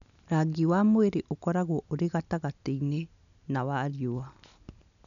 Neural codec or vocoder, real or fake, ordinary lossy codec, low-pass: none; real; none; 7.2 kHz